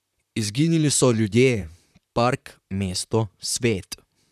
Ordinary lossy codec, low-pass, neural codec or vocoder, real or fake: none; 14.4 kHz; vocoder, 44.1 kHz, 128 mel bands, Pupu-Vocoder; fake